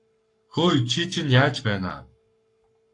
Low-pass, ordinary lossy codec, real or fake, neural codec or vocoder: 10.8 kHz; AAC, 64 kbps; fake; codec, 44.1 kHz, 7.8 kbps, Pupu-Codec